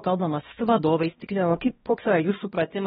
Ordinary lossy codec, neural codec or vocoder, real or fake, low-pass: AAC, 16 kbps; codec, 16 kHz, 1 kbps, X-Codec, HuBERT features, trained on balanced general audio; fake; 7.2 kHz